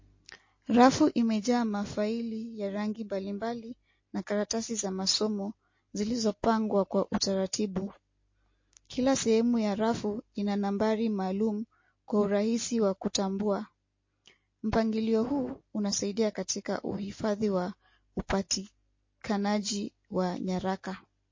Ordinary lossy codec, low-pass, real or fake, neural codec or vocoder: MP3, 32 kbps; 7.2 kHz; real; none